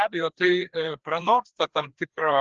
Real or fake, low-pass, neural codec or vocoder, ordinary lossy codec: fake; 7.2 kHz; codec, 16 kHz, 2 kbps, FreqCodec, larger model; Opus, 16 kbps